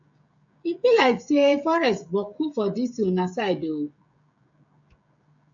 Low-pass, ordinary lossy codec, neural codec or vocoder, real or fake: 7.2 kHz; AAC, 64 kbps; codec, 16 kHz, 16 kbps, FreqCodec, smaller model; fake